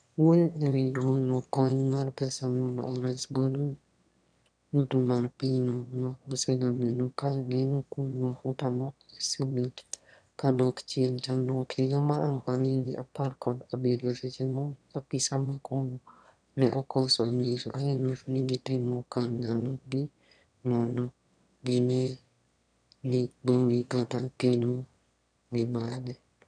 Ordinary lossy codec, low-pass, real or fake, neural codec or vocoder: none; 9.9 kHz; fake; autoencoder, 22.05 kHz, a latent of 192 numbers a frame, VITS, trained on one speaker